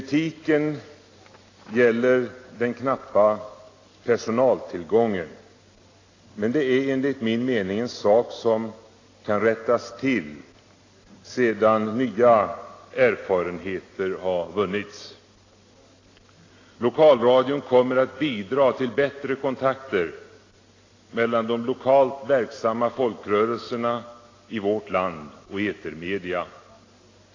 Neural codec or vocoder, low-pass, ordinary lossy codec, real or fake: none; 7.2 kHz; AAC, 32 kbps; real